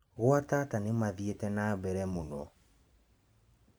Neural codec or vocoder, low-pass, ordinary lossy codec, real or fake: vocoder, 44.1 kHz, 128 mel bands every 512 samples, BigVGAN v2; none; none; fake